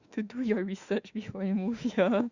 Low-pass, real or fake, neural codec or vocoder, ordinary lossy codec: 7.2 kHz; fake; autoencoder, 48 kHz, 32 numbers a frame, DAC-VAE, trained on Japanese speech; Opus, 64 kbps